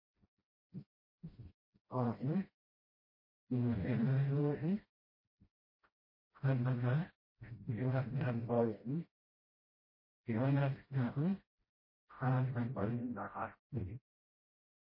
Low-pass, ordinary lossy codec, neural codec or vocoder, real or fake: 5.4 kHz; MP3, 24 kbps; codec, 16 kHz, 0.5 kbps, FreqCodec, smaller model; fake